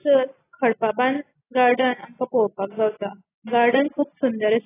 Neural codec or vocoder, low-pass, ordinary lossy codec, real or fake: none; 3.6 kHz; AAC, 16 kbps; real